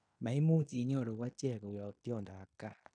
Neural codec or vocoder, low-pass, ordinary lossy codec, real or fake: codec, 16 kHz in and 24 kHz out, 0.9 kbps, LongCat-Audio-Codec, fine tuned four codebook decoder; 9.9 kHz; none; fake